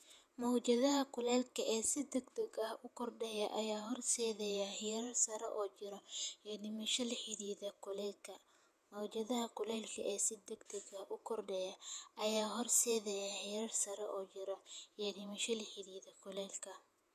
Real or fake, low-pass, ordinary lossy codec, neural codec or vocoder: fake; 14.4 kHz; none; vocoder, 48 kHz, 128 mel bands, Vocos